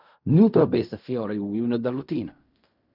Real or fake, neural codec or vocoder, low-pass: fake; codec, 16 kHz in and 24 kHz out, 0.4 kbps, LongCat-Audio-Codec, fine tuned four codebook decoder; 5.4 kHz